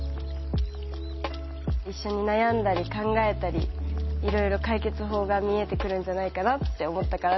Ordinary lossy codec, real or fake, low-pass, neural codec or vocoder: MP3, 24 kbps; real; 7.2 kHz; none